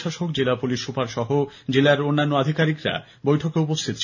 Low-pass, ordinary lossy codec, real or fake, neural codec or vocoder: 7.2 kHz; none; real; none